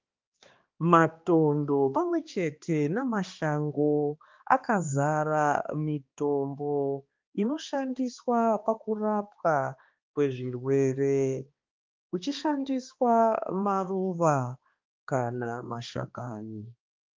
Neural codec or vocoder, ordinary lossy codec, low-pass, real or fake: codec, 16 kHz, 2 kbps, X-Codec, HuBERT features, trained on balanced general audio; Opus, 24 kbps; 7.2 kHz; fake